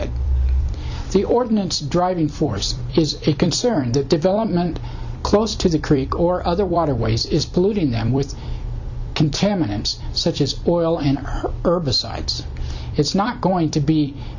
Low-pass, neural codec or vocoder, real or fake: 7.2 kHz; vocoder, 44.1 kHz, 128 mel bands every 256 samples, BigVGAN v2; fake